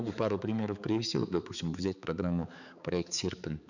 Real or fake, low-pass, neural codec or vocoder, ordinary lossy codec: fake; 7.2 kHz; codec, 16 kHz, 4 kbps, X-Codec, HuBERT features, trained on balanced general audio; none